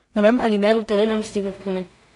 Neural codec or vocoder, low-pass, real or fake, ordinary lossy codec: codec, 16 kHz in and 24 kHz out, 0.4 kbps, LongCat-Audio-Codec, two codebook decoder; 10.8 kHz; fake; none